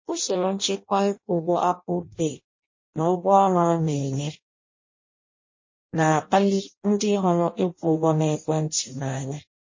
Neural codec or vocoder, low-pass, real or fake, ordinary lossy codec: codec, 16 kHz in and 24 kHz out, 0.6 kbps, FireRedTTS-2 codec; 7.2 kHz; fake; MP3, 32 kbps